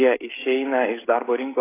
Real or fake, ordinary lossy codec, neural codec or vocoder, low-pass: fake; AAC, 16 kbps; codec, 16 kHz, 16 kbps, FreqCodec, smaller model; 3.6 kHz